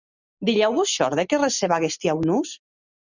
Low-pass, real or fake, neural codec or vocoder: 7.2 kHz; real; none